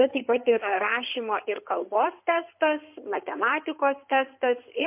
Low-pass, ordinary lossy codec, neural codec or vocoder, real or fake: 3.6 kHz; MP3, 32 kbps; codec, 16 kHz in and 24 kHz out, 2.2 kbps, FireRedTTS-2 codec; fake